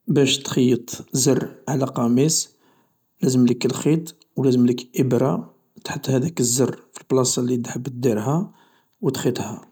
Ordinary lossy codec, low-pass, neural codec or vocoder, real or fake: none; none; none; real